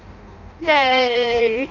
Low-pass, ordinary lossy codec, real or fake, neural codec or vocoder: 7.2 kHz; none; fake; codec, 16 kHz in and 24 kHz out, 0.6 kbps, FireRedTTS-2 codec